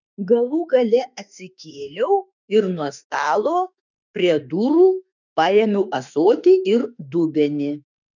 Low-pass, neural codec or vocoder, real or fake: 7.2 kHz; autoencoder, 48 kHz, 32 numbers a frame, DAC-VAE, trained on Japanese speech; fake